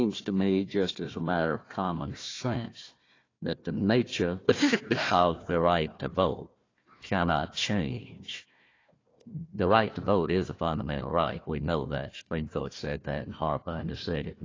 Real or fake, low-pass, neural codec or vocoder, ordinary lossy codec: fake; 7.2 kHz; codec, 16 kHz, 1 kbps, FunCodec, trained on Chinese and English, 50 frames a second; AAC, 32 kbps